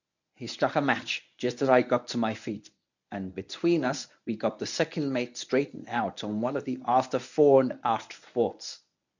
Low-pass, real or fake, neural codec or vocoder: 7.2 kHz; fake; codec, 24 kHz, 0.9 kbps, WavTokenizer, medium speech release version 1